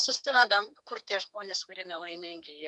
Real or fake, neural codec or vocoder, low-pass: fake; codec, 32 kHz, 1.9 kbps, SNAC; 10.8 kHz